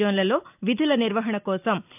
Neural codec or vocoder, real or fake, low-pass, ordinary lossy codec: none; real; 3.6 kHz; none